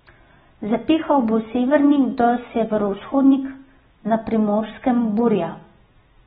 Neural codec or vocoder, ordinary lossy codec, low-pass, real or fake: none; AAC, 16 kbps; 19.8 kHz; real